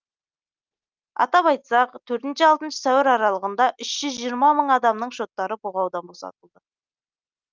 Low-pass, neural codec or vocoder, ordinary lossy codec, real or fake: 7.2 kHz; none; Opus, 24 kbps; real